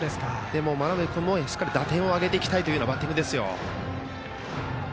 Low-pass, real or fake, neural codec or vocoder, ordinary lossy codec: none; real; none; none